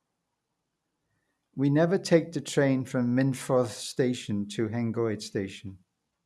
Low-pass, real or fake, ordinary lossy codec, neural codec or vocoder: none; real; none; none